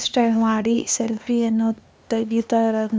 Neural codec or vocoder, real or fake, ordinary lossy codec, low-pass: codec, 16 kHz, 2 kbps, X-Codec, HuBERT features, trained on LibriSpeech; fake; none; none